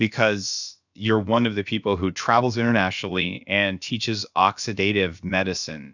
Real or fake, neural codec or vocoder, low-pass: fake; codec, 16 kHz, about 1 kbps, DyCAST, with the encoder's durations; 7.2 kHz